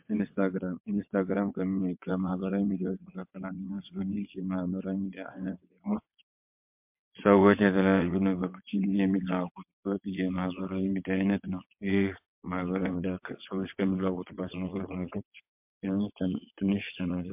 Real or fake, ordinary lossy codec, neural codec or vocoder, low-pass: fake; MP3, 32 kbps; vocoder, 22.05 kHz, 80 mel bands, WaveNeXt; 3.6 kHz